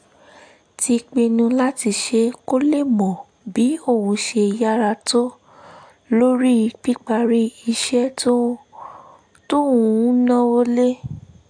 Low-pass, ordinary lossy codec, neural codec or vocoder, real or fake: 9.9 kHz; AAC, 64 kbps; none; real